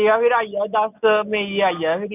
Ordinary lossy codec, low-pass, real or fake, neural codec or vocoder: none; 3.6 kHz; real; none